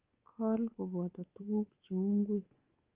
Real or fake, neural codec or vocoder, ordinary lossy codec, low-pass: fake; codec, 24 kHz, 3.1 kbps, DualCodec; Opus, 24 kbps; 3.6 kHz